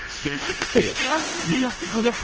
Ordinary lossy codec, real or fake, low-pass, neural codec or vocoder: Opus, 16 kbps; fake; 7.2 kHz; codec, 16 kHz in and 24 kHz out, 0.4 kbps, LongCat-Audio-Codec, four codebook decoder